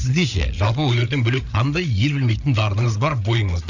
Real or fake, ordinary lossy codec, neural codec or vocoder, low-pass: fake; none; codec, 16 kHz, 8 kbps, FreqCodec, larger model; 7.2 kHz